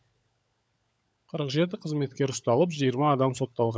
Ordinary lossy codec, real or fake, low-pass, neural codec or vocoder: none; fake; none; codec, 16 kHz, 16 kbps, FunCodec, trained on LibriTTS, 50 frames a second